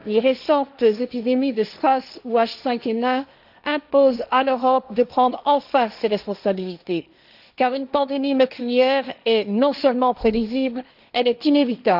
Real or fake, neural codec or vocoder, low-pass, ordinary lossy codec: fake; codec, 16 kHz, 1.1 kbps, Voila-Tokenizer; 5.4 kHz; none